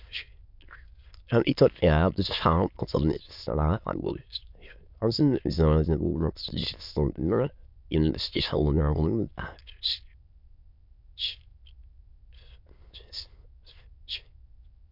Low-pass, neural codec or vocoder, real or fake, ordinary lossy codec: 5.4 kHz; autoencoder, 22.05 kHz, a latent of 192 numbers a frame, VITS, trained on many speakers; fake; MP3, 48 kbps